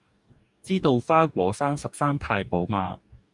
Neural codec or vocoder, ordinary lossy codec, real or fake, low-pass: codec, 44.1 kHz, 2.6 kbps, DAC; Opus, 64 kbps; fake; 10.8 kHz